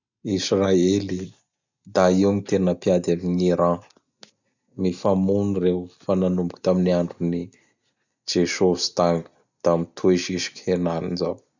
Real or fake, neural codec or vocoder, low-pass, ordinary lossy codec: real; none; 7.2 kHz; none